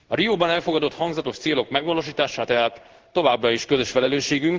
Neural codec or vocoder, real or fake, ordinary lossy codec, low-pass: codec, 16 kHz in and 24 kHz out, 1 kbps, XY-Tokenizer; fake; Opus, 16 kbps; 7.2 kHz